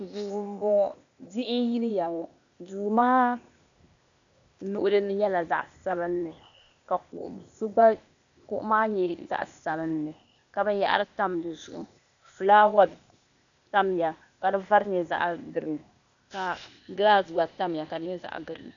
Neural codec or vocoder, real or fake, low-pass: codec, 16 kHz, 0.8 kbps, ZipCodec; fake; 7.2 kHz